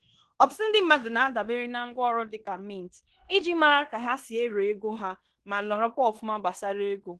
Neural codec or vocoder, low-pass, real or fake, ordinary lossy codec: codec, 16 kHz in and 24 kHz out, 0.9 kbps, LongCat-Audio-Codec, fine tuned four codebook decoder; 10.8 kHz; fake; Opus, 16 kbps